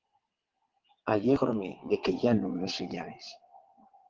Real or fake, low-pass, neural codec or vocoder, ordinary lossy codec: fake; 7.2 kHz; vocoder, 22.05 kHz, 80 mel bands, WaveNeXt; Opus, 16 kbps